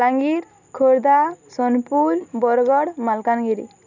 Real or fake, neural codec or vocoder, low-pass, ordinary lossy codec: real; none; 7.2 kHz; none